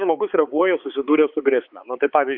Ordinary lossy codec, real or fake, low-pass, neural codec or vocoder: Opus, 64 kbps; fake; 5.4 kHz; codec, 16 kHz, 4 kbps, X-Codec, HuBERT features, trained on balanced general audio